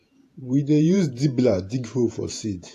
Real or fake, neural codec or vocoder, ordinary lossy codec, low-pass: fake; vocoder, 48 kHz, 128 mel bands, Vocos; AAC, 64 kbps; 14.4 kHz